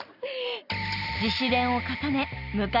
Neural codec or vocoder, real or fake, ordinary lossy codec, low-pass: none; real; none; 5.4 kHz